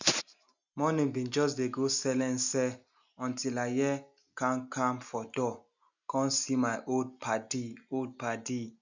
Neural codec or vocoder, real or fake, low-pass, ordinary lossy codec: none; real; 7.2 kHz; none